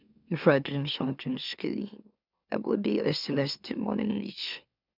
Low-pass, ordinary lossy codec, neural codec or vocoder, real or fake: 5.4 kHz; none; autoencoder, 44.1 kHz, a latent of 192 numbers a frame, MeloTTS; fake